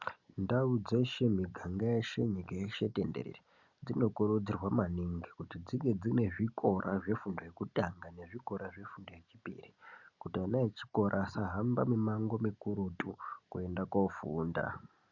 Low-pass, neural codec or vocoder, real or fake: 7.2 kHz; none; real